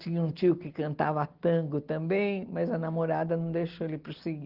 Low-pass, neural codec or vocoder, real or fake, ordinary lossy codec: 5.4 kHz; none; real; Opus, 16 kbps